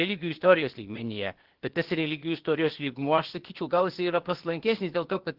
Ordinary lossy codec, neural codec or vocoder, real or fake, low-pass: Opus, 16 kbps; codec, 16 kHz, 0.8 kbps, ZipCodec; fake; 5.4 kHz